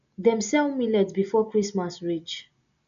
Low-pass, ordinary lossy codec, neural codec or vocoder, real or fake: 7.2 kHz; none; none; real